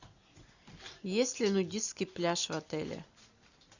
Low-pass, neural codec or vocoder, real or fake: 7.2 kHz; none; real